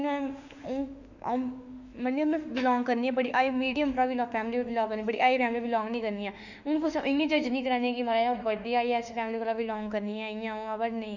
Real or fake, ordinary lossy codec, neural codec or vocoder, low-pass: fake; none; autoencoder, 48 kHz, 32 numbers a frame, DAC-VAE, trained on Japanese speech; 7.2 kHz